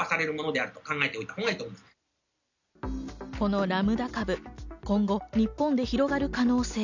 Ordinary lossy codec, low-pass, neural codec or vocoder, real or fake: none; 7.2 kHz; none; real